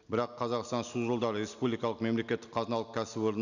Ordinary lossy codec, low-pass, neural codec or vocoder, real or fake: none; 7.2 kHz; none; real